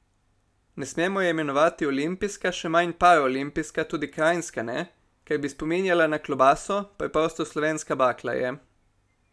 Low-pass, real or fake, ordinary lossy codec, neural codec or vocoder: none; real; none; none